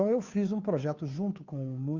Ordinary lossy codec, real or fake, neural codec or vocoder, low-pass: Opus, 64 kbps; fake; codec, 16 kHz, 2 kbps, FunCodec, trained on Chinese and English, 25 frames a second; 7.2 kHz